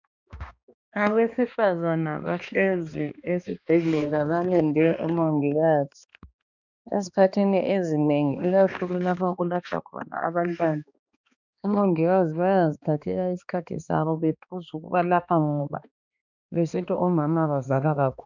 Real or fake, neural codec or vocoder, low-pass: fake; codec, 16 kHz, 2 kbps, X-Codec, HuBERT features, trained on balanced general audio; 7.2 kHz